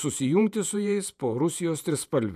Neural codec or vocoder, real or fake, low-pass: vocoder, 48 kHz, 128 mel bands, Vocos; fake; 14.4 kHz